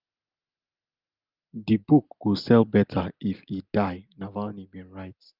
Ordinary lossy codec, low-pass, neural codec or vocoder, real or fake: Opus, 32 kbps; 5.4 kHz; none; real